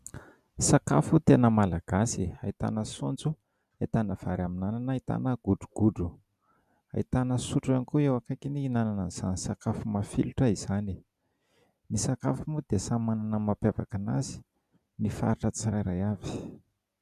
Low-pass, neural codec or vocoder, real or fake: 14.4 kHz; none; real